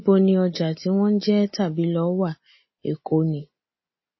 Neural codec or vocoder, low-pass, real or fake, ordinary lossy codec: none; 7.2 kHz; real; MP3, 24 kbps